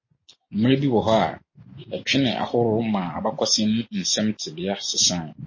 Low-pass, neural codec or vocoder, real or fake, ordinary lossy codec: 7.2 kHz; none; real; MP3, 32 kbps